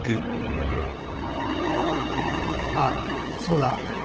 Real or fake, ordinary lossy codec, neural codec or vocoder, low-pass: fake; Opus, 16 kbps; codec, 16 kHz, 8 kbps, FunCodec, trained on LibriTTS, 25 frames a second; 7.2 kHz